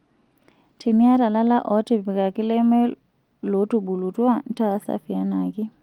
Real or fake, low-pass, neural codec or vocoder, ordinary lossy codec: real; 19.8 kHz; none; Opus, 32 kbps